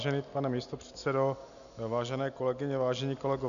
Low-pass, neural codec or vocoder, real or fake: 7.2 kHz; none; real